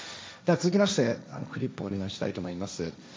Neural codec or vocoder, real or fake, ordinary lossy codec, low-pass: codec, 16 kHz, 1.1 kbps, Voila-Tokenizer; fake; none; none